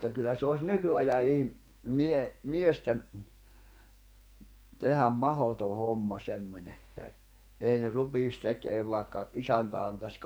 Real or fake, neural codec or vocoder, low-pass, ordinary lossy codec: fake; codec, 44.1 kHz, 2.6 kbps, SNAC; none; none